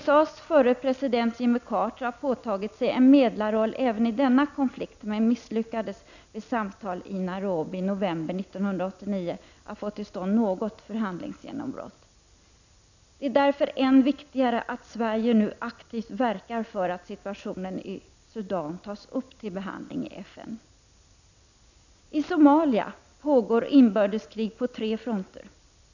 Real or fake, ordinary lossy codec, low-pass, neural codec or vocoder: real; none; 7.2 kHz; none